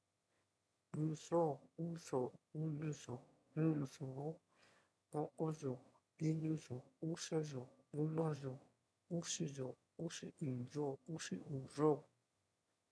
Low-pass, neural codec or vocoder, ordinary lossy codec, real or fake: none; autoencoder, 22.05 kHz, a latent of 192 numbers a frame, VITS, trained on one speaker; none; fake